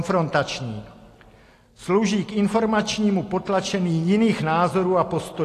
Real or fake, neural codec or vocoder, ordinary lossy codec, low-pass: real; none; AAC, 48 kbps; 14.4 kHz